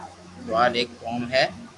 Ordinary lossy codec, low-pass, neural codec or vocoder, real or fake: AAC, 64 kbps; 10.8 kHz; autoencoder, 48 kHz, 128 numbers a frame, DAC-VAE, trained on Japanese speech; fake